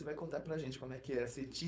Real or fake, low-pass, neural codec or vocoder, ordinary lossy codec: fake; none; codec, 16 kHz, 4.8 kbps, FACodec; none